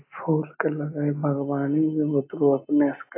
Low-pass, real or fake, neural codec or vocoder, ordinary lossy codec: 3.6 kHz; real; none; AAC, 24 kbps